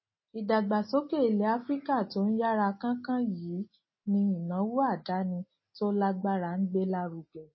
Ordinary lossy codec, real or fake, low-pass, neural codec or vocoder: MP3, 24 kbps; real; 7.2 kHz; none